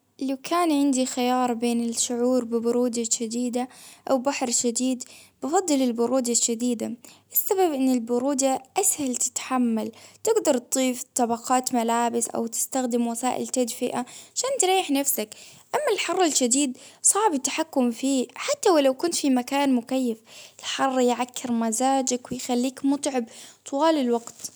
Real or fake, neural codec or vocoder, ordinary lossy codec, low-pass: real; none; none; none